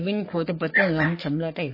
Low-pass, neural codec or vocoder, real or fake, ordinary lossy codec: 5.4 kHz; codec, 44.1 kHz, 3.4 kbps, Pupu-Codec; fake; MP3, 24 kbps